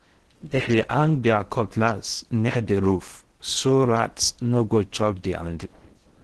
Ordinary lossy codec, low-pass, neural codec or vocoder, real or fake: Opus, 16 kbps; 10.8 kHz; codec, 16 kHz in and 24 kHz out, 0.8 kbps, FocalCodec, streaming, 65536 codes; fake